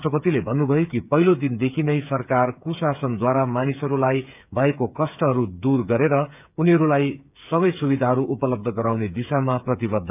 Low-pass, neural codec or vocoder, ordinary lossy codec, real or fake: 3.6 kHz; codec, 16 kHz, 8 kbps, FreqCodec, smaller model; none; fake